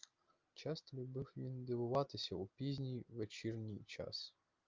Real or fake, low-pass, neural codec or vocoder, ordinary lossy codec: real; 7.2 kHz; none; Opus, 32 kbps